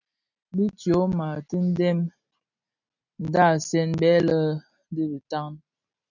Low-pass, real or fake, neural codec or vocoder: 7.2 kHz; real; none